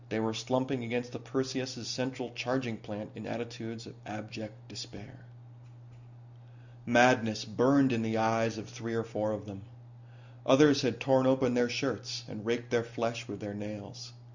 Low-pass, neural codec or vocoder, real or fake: 7.2 kHz; none; real